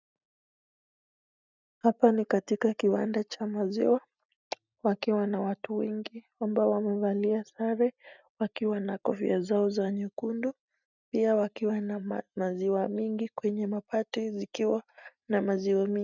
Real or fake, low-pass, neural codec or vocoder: real; 7.2 kHz; none